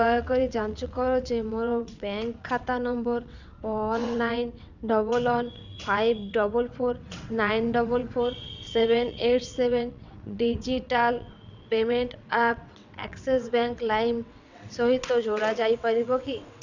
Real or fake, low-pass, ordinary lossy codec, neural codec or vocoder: fake; 7.2 kHz; none; vocoder, 22.05 kHz, 80 mel bands, WaveNeXt